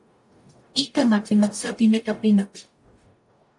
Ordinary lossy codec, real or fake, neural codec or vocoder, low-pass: AAC, 64 kbps; fake; codec, 44.1 kHz, 0.9 kbps, DAC; 10.8 kHz